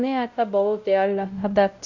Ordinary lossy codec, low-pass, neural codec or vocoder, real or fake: none; 7.2 kHz; codec, 16 kHz, 0.5 kbps, X-Codec, WavLM features, trained on Multilingual LibriSpeech; fake